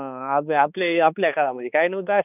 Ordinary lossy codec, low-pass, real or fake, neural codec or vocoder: none; 3.6 kHz; fake; codec, 16 kHz, 2 kbps, X-Codec, HuBERT features, trained on balanced general audio